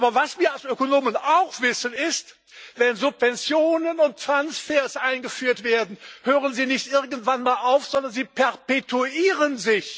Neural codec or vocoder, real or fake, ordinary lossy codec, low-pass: none; real; none; none